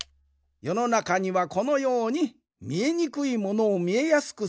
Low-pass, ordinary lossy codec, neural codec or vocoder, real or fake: none; none; none; real